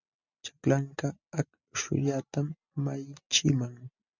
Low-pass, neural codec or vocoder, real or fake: 7.2 kHz; none; real